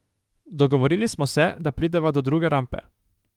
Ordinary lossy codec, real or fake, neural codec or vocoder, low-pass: Opus, 24 kbps; fake; autoencoder, 48 kHz, 32 numbers a frame, DAC-VAE, trained on Japanese speech; 19.8 kHz